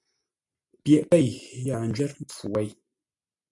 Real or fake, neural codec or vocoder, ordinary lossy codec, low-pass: real; none; MP3, 64 kbps; 10.8 kHz